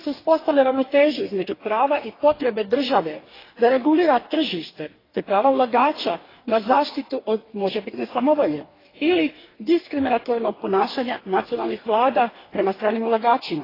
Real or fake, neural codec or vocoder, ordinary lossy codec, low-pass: fake; codec, 44.1 kHz, 2.6 kbps, DAC; AAC, 24 kbps; 5.4 kHz